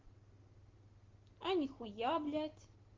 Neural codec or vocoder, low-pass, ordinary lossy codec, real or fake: none; 7.2 kHz; Opus, 16 kbps; real